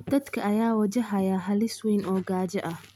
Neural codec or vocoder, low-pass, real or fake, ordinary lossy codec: none; 19.8 kHz; real; none